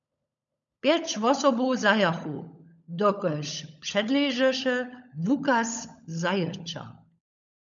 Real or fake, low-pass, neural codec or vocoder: fake; 7.2 kHz; codec, 16 kHz, 16 kbps, FunCodec, trained on LibriTTS, 50 frames a second